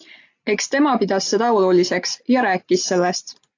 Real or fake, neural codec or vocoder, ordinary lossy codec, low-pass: real; none; AAC, 48 kbps; 7.2 kHz